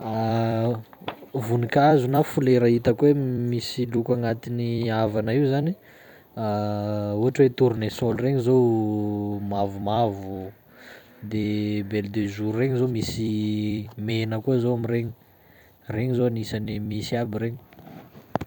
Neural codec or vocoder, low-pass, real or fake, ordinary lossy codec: vocoder, 44.1 kHz, 128 mel bands every 256 samples, BigVGAN v2; 19.8 kHz; fake; none